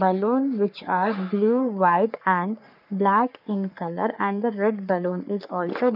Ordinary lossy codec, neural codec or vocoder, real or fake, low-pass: none; codec, 44.1 kHz, 3.4 kbps, Pupu-Codec; fake; 5.4 kHz